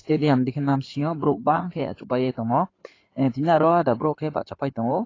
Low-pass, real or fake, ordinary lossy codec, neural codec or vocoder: 7.2 kHz; fake; AAC, 32 kbps; codec, 16 kHz in and 24 kHz out, 2.2 kbps, FireRedTTS-2 codec